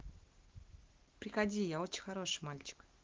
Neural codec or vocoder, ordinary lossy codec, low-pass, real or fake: none; Opus, 16 kbps; 7.2 kHz; real